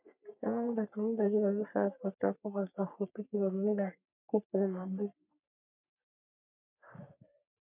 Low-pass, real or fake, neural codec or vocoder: 3.6 kHz; fake; codec, 44.1 kHz, 3.4 kbps, Pupu-Codec